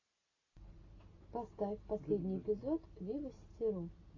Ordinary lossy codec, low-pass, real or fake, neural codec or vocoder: AAC, 32 kbps; 7.2 kHz; real; none